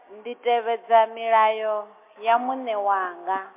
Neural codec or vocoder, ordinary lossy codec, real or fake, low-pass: none; MP3, 24 kbps; real; 3.6 kHz